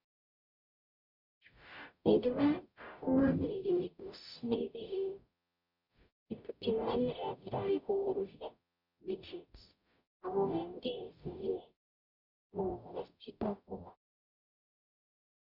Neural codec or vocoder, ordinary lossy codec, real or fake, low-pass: codec, 44.1 kHz, 0.9 kbps, DAC; none; fake; 5.4 kHz